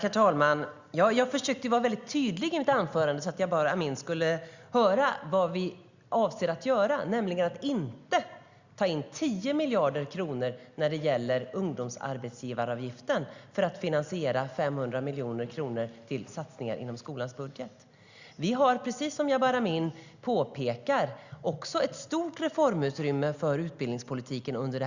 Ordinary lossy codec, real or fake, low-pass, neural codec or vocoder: Opus, 64 kbps; real; 7.2 kHz; none